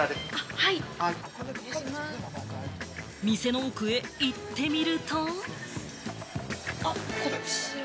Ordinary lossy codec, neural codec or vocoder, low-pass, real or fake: none; none; none; real